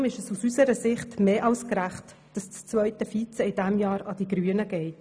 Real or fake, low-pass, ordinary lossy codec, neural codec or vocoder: real; none; none; none